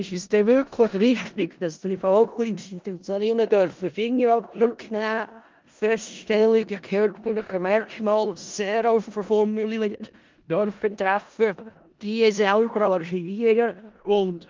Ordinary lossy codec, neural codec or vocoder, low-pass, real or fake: Opus, 24 kbps; codec, 16 kHz in and 24 kHz out, 0.4 kbps, LongCat-Audio-Codec, four codebook decoder; 7.2 kHz; fake